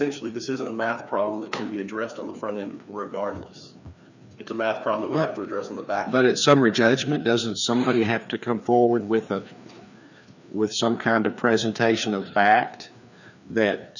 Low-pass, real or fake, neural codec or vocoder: 7.2 kHz; fake; codec, 16 kHz, 2 kbps, FreqCodec, larger model